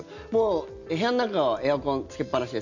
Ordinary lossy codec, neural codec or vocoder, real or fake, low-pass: none; none; real; 7.2 kHz